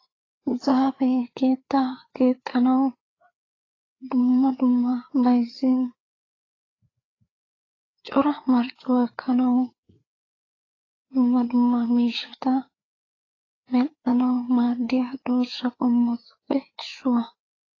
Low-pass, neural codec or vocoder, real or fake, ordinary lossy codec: 7.2 kHz; codec, 16 kHz, 4 kbps, FreqCodec, larger model; fake; AAC, 32 kbps